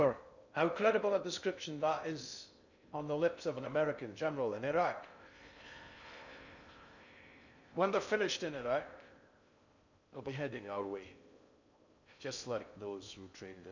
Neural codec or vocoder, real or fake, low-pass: codec, 16 kHz in and 24 kHz out, 0.6 kbps, FocalCodec, streaming, 2048 codes; fake; 7.2 kHz